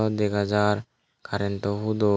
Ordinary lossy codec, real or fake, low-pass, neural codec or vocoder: none; real; none; none